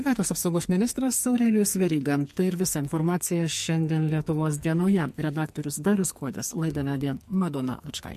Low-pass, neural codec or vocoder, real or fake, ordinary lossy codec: 14.4 kHz; codec, 44.1 kHz, 2.6 kbps, SNAC; fake; MP3, 64 kbps